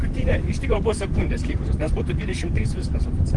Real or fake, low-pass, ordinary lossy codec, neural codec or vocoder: fake; 10.8 kHz; Opus, 24 kbps; vocoder, 44.1 kHz, 128 mel bands, Pupu-Vocoder